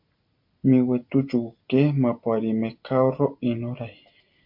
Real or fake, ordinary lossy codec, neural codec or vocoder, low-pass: real; MP3, 32 kbps; none; 5.4 kHz